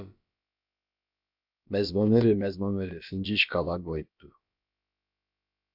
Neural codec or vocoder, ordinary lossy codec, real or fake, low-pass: codec, 16 kHz, about 1 kbps, DyCAST, with the encoder's durations; MP3, 48 kbps; fake; 5.4 kHz